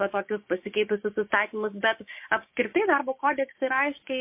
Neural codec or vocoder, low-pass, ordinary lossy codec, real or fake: codec, 16 kHz, 6 kbps, DAC; 3.6 kHz; MP3, 24 kbps; fake